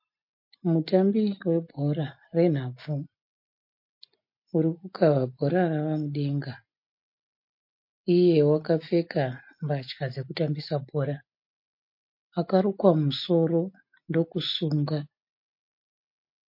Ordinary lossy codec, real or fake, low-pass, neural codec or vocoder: MP3, 32 kbps; real; 5.4 kHz; none